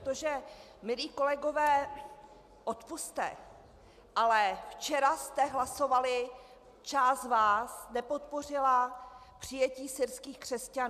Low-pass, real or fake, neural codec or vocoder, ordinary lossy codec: 14.4 kHz; real; none; MP3, 96 kbps